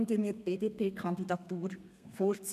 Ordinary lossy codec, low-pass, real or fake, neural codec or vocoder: none; 14.4 kHz; fake; codec, 32 kHz, 1.9 kbps, SNAC